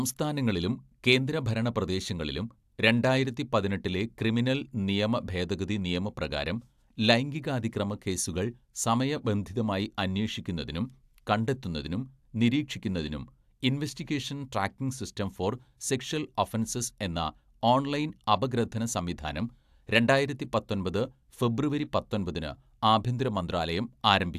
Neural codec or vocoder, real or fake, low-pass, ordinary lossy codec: none; real; 14.4 kHz; AAC, 96 kbps